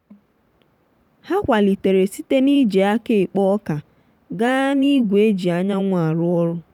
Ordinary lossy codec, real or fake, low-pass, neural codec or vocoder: none; fake; 19.8 kHz; vocoder, 44.1 kHz, 128 mel bands every 512 samples, BigVGAN v2